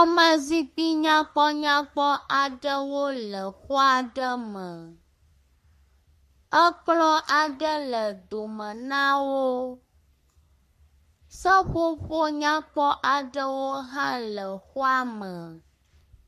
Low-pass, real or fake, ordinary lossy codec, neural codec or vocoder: 14.4 kHz; fake; MP3, 64 kbps; codec, 44.1 kHz, 3.4 kbps, Pupu-Codec